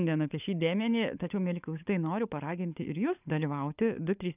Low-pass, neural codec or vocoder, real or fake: 3.6 kHz; codec, 16 kHz, 16 kbps, FunCodec, trained on LibriTTS, 50 frames a second; fake